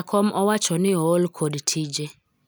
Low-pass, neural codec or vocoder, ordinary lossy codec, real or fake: none; none; none; real